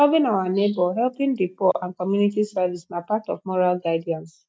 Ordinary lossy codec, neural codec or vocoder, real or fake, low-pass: none; none; real; none